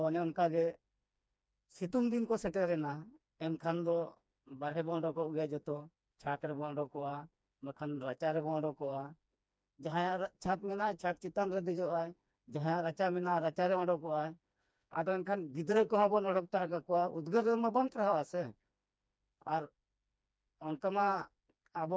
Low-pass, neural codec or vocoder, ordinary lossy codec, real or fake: none; codec, 16 kHz, 2 kbps, FreqCodec, smaller model; none; fake